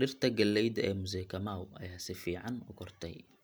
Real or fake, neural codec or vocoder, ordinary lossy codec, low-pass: real; none; none; none